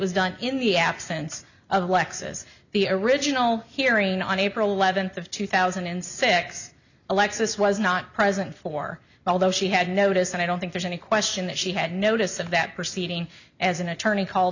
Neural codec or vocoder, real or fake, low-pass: none; real; 7.2 kHz